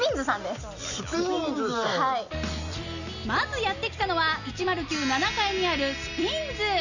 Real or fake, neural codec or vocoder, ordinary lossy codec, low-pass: real; none; none; 7.2 kHz